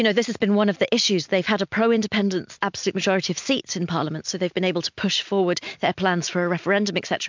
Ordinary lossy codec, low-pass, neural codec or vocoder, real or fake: MP3, 64 kbps; 7.2 kHz; none; real